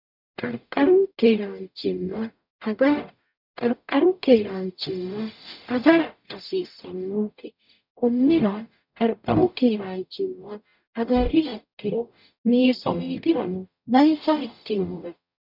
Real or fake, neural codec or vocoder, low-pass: fake; codec, 44.1 kHz, 0.9 kbps, DAC; 5.4 kHz